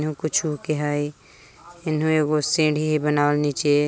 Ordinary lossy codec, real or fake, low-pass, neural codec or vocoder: none; real; none; none